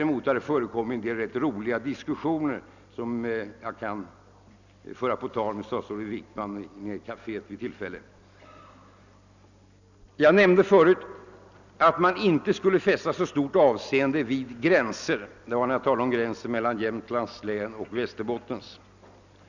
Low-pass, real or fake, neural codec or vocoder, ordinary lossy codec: 7.2 kHz; real; none; none